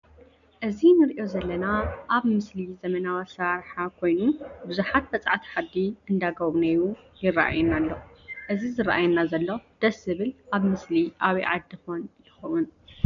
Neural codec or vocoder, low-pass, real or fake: none; 7.2 kHz; real